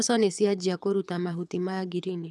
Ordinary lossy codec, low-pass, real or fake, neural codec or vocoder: none; none; fake; codec, 24 kHz, 6 kbps, HILCodec